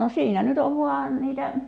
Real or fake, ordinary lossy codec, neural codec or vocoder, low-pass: real; none; none; 9.9 kHz